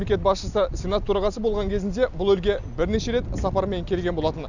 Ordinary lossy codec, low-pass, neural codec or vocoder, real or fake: none; 7.2 kHz; none; real